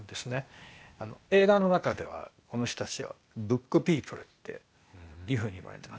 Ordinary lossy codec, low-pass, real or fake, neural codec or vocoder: none; none; fake; codec, 16 kHz, 0.8 kbps, ZipCodec